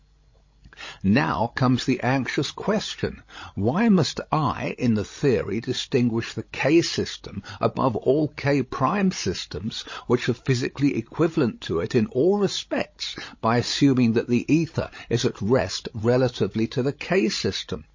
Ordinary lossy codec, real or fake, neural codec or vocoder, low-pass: MP3, 32 kbps; fake; codec, 16 kHz, 16 kbps, FreqCodec, larger model; 7.2 kHz